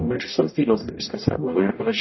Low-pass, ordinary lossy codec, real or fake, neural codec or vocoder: 7.2 kHz; MP3, 24 kbps; fake; codec, 44.1 kHz, 0.9 kbps, DAC